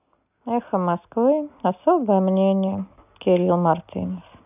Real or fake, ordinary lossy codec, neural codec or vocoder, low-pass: real; none; none; 3.6 kHz